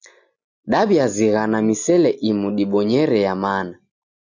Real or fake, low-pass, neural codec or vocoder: real; 7.2 kHz; none